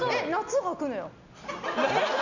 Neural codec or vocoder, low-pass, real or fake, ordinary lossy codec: none; 7.2 kHz; real; none